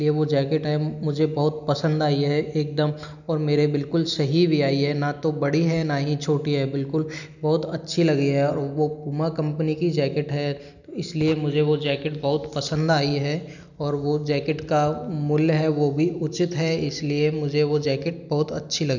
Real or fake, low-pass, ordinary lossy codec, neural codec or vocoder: real; 7.2 kHz; none; none